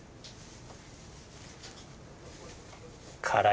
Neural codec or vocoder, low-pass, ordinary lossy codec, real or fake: none; none; none; real